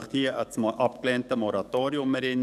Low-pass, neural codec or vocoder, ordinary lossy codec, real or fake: 14.4 kHz; codec, 44.1 kHz, 7.8 kbps, DAC; none; fake